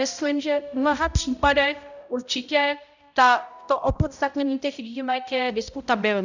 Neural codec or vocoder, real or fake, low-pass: codec, 16 kHz, 0.5 kbps, X-Codec, HuBERT features, trained on balanced general audio; fake; 7.2 kHz